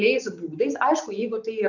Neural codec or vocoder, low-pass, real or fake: none; 7.2 kHz; real